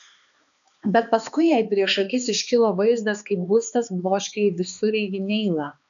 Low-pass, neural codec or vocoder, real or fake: 7.2 kHz; codec, 16 kHz, 2 kbps, X-Codec, HuBERT features, trained on balanced general audio; fake